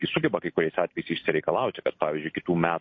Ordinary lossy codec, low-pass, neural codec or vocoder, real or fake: MP3, 32 kbps; 7.2 kHz; none; real